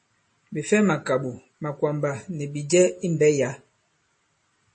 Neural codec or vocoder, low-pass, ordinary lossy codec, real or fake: none; 10.8 kHz; MP3, 32 kbps; real